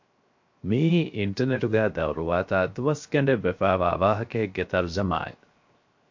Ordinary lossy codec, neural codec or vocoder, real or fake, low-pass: MP3, 48 kbps; codec, 16 kHz, 0.7 kbps, FocalCodec; fake; 7.2 kHz